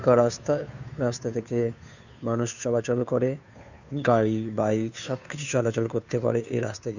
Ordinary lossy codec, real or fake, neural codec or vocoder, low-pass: none; fake; codec, 16 kHz, 2 kbps, FunCodec, trained on Chinese and English, 25 frames a second; 7.2 kHz